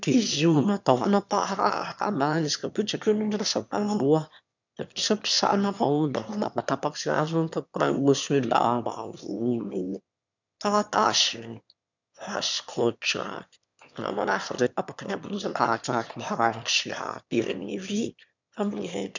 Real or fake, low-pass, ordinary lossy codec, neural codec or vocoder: fake; 7.2 kHz; none; autoencoder, 22.05 kHz, a latent of 192 numbers a frame, VITS, trained on one speaker